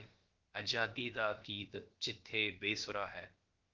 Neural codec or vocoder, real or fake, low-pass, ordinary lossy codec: codec, 16 kHz, about 1 kbps, DyCAST, with the encoder's durations; fake; 7.2 kHz; Opus, 16 kbps